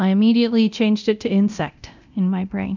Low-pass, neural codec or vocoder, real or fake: 7.2 kHz; codec, 24 kHz, 0.9 kbps, DualCodec; fake